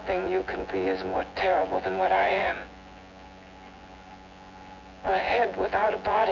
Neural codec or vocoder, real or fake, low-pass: vocoder, 24 kHz, 100 mel bands, Vocos; fake; 7.2 kHz